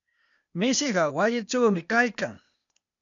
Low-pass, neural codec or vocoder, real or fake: 7.2 kHz; codec, 16 kHz, 0.8 kbps, ZipCodec; fake